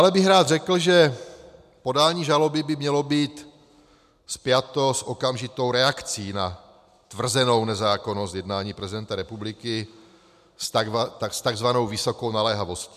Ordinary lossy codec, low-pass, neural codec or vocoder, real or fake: MP3, 96 kbps; 14.4 kHz; none; real